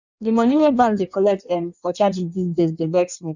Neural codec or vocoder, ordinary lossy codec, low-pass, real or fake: codec, 16 kHz in and 24 kHz out, 1.1 kbps, FireRedTTS-2 codec; none; 7.2 kHz; fake